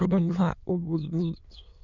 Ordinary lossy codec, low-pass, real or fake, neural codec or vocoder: none; 7.2 kHz; fake; autoencoder, 22.05 kHz, a latent of 192 numbers a frame, VITS, trained on many speakers